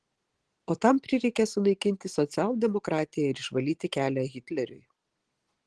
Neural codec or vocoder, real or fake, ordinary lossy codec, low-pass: none; real; Opus, 16 kbps; 10.8 kHz